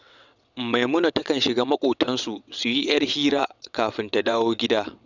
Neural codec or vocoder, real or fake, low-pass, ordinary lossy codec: vocoder, 22.05 kHz, 80 mel bands, WaveNeXt; fake; 7.2 kHz; none